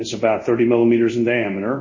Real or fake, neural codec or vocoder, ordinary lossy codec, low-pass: fake; codec, 24 kHz, 0.5 kbps, DualCodec; MP3, 32 kbps; 7.2 kHz